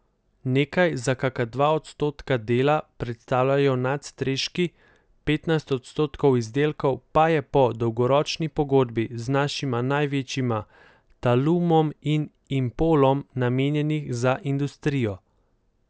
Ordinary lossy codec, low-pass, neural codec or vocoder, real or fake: none; none; none; real